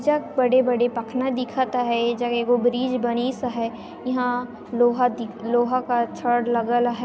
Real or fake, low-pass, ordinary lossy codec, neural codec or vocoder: real; none; none; none